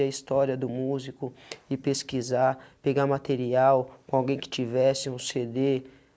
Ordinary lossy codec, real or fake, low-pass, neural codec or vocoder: none; real; none; none